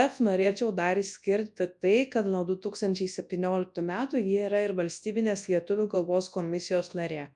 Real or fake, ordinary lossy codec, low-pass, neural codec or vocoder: fake; MP3, 96 kbps; 10.8 kHz; codec, 24 kHz, 0.9 kbps, WavTokenizer, large speech release